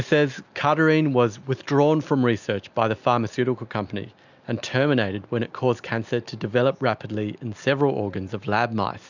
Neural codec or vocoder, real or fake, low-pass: none; real; 7.2 kHz